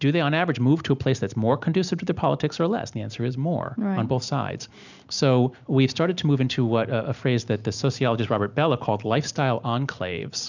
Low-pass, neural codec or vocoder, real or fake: 7.2 kHz; none; real